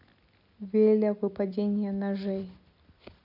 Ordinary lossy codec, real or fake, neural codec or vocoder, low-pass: none; real; none; 5.4 kHz